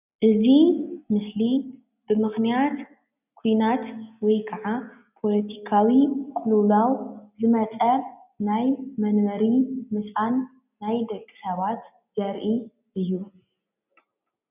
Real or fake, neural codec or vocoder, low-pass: real; none; 3.6 kHz